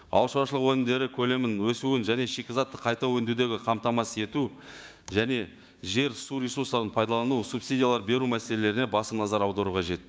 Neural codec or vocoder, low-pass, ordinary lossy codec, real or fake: codec, 16 kHz, 6 kbps, DAC; none; none; fake